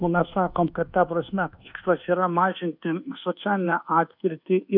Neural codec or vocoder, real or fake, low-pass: codec, 24 kHz, 1.2 kbps, DualCodec; fake; 5.4 kHz